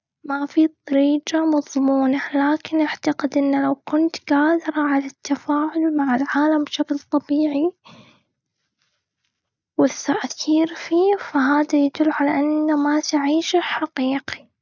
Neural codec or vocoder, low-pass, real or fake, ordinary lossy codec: none; 7.2 kHz; real; none